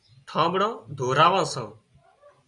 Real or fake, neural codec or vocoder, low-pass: real; none; 10.8 kHz